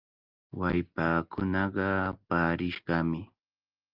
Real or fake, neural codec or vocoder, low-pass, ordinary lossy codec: real; none; 5.4 kHz; Opus, 32 kbps